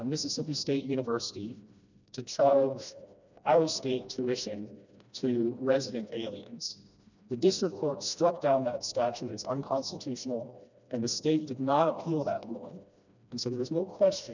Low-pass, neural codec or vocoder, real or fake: 7.2 kHz; codec, 16 kHz, 1 kbps, FreqCodec, smaller model; fake